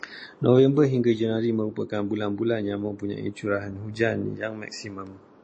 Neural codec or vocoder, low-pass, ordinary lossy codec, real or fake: none; 9.9 kHz; MP3, 32 kbps; real